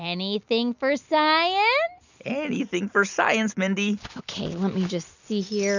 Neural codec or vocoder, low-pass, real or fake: none; 7.2 kHz; real